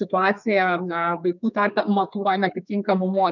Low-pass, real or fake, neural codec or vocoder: 7.2 kHz; fake; codec, 32 kHz, 1.9 kbps, SNAC